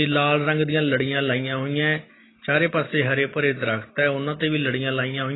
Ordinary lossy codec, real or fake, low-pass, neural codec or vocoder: AAC, 16 kbps; real; 7.2 kHz; none